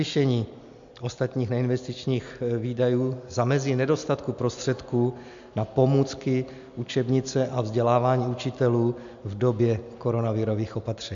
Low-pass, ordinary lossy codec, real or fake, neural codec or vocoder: 7.2 kHz; MP3, 64 kbps; real; none